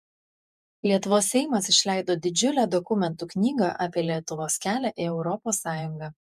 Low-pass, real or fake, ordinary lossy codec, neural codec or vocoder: 14.4 kHz; fake; MP3, 96 kbps; vocoder, 48 kHz, 128 mel bands, Vocos